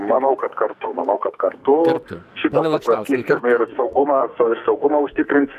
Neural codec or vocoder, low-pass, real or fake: codec, 44.1 kHz, 2.6 kbps, SNAC; 14.4 kHz; fake